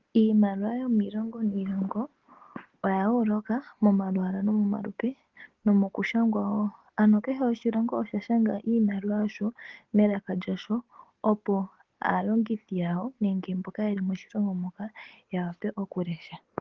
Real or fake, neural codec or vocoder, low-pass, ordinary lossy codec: real; none; 7.2 kHz; Opus, 16 kbps